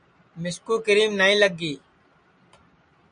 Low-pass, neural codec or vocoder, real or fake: 9.9 kHz; none; real